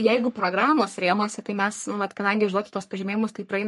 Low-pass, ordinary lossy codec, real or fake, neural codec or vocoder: 14.4 kHz; MP3, 48 kbps; fake; codec, 44.1 kHz, 3.4 kbps, Pupu-Codec